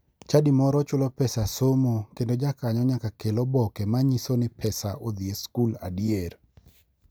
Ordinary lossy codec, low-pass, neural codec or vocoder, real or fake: none; none; none; real